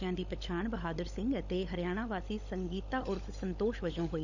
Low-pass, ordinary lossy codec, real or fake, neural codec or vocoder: 7.2 kHz; none; fake; codec, 16 kHz, 16 kbps, FunCodec, trained on LibriTTS, 50 frames a second